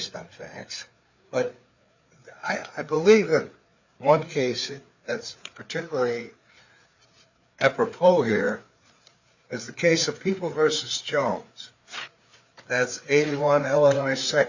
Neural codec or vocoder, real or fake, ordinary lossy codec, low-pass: codec, 16 kHz, 4 kbps, FreqCodec, larger model; fake; Opus, 64 kbps; 7.2 kHz